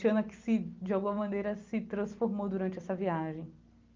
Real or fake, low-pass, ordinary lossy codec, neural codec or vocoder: real; 7.2 kHz; Opus, 32 kbps; none